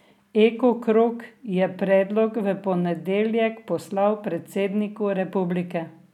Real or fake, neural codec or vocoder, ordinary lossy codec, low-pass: real; none; none; 19.8 kHz